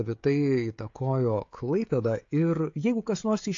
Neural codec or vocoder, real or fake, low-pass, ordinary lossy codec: codec, 16 kHz, 16 kbps, FreqCodec, smaller model; fake; 7.2 kHz; AAC, 48 kbps